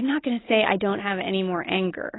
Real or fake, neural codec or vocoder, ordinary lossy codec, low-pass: real; none; AAC, 16 kbps; 7.2 kHz